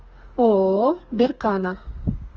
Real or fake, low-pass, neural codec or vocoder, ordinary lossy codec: fake; 7.2 kHz; codec, 32 kHz, 1.9 kbps, SNAC; Opus, 24 kbps